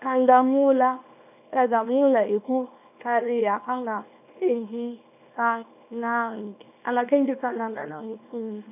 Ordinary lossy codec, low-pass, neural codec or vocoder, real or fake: none; 3.6 kHz; codec, 24 kHz, 0.9 kbps, WavTokenizer, small release; fake